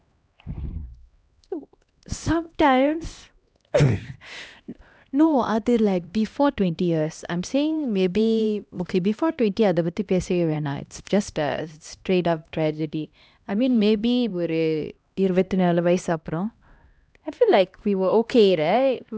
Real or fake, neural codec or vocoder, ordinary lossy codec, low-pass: fake; codec, 16 kHz, 1 kbps, X-Codec, HuBERT features, trained on LibriSpeech; none; none